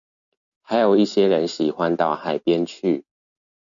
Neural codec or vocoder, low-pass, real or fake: none; 7.2 kHz; real